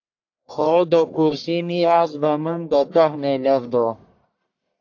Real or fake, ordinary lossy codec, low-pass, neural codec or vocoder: fake; AAC, 48 kbps; 7.2 kHz; codec, 44.1 kHz, 1.7 kbps, Pupu-Codec